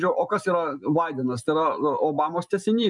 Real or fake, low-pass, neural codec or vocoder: real; 10.8 kHz; none